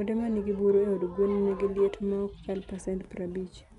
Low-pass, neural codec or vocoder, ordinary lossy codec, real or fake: 10.8 kHz; none; none; real